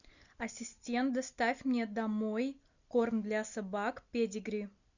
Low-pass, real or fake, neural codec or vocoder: 7.2 kHz; real; none